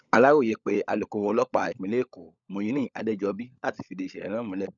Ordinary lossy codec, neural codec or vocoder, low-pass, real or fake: none; codec, 16 kHz, 16 kbps, FunCodec, trained on LibriTTS, 50 frames a second; 7.2 kHz; fake